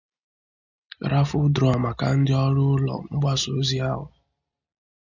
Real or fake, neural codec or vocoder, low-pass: real; none; 7.2 kHz